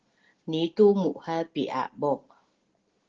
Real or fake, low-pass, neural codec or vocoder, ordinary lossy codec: real; 7.2 kHz; none; Opus, 16 kbps